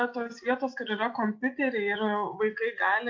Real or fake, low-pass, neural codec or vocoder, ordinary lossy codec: real; 7.2 kHz; none; MP3, 48 kbps